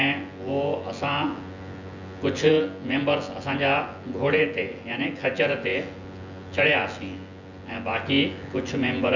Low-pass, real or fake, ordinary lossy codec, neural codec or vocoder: 7.2 kHz; fake; Opus, 64 kbps; vocoder, 24 kHz, 100 mel bands, Vocos